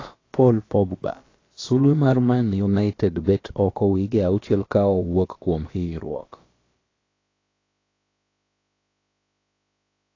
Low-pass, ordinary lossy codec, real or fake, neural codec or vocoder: 7.2 kHz; AAC, 32 kbps; fake; codec, 16 kHz, about 1 kbps, DyCAST, with the encoder's durations